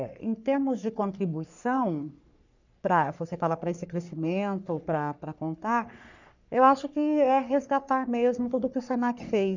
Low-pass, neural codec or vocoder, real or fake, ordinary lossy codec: 7.2 kHz; codec, 44.1 kHz, 3.4 kbps, Pupu-Codec; fake; none